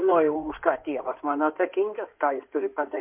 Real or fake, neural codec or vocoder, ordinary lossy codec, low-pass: fake; codec, 16 kHz in and 24 kHz out, 2.2 kbps, FireRedTTS-2 codec; MP3, 32 kbps; 3.6 kHz